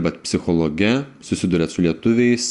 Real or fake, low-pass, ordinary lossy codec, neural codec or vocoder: real; 10.8 kHz; Opus, 64 kbps; none